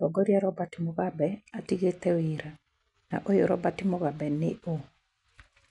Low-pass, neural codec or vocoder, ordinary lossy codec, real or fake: 10.8 kHz; none; none; real